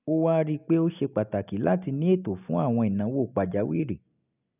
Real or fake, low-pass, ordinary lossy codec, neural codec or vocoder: real; 3.6 kHz; none; none